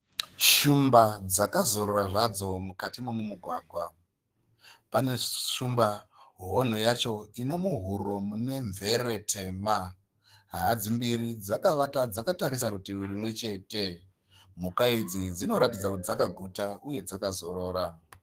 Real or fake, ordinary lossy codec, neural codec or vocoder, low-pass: fake; Opus, 24 kbps; codec, 32 kHz, 1.9 kbps, SNAC; 14.4 kHz